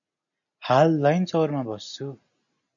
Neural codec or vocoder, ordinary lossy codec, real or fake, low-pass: none; MP3, 96 kbps; real; 7.2 kHz